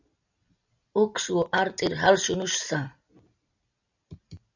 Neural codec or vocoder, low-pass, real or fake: none; 7.2 kHz; real